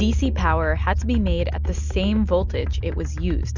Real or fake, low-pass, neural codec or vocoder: real; 7.2 kHz; none